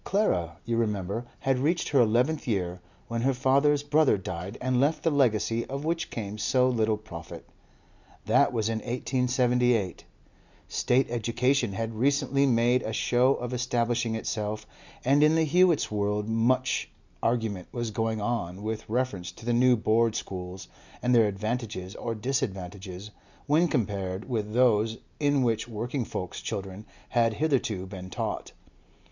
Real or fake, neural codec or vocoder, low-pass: real; none; 7.2 kHz